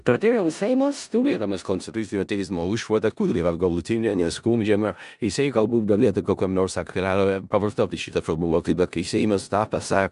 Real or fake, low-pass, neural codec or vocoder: fake; 10.8 kHz; codec, 16 kHz in and 24 kHz out, 0.4 kbps, LongCat-Audio-Codec, four codebook decoder